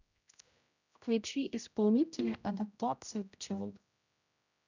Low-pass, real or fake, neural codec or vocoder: 7.2 kHz; fake; codec, 16 kHz, 0.5 kbps, X-Codec, HuBERT features, trained on general audio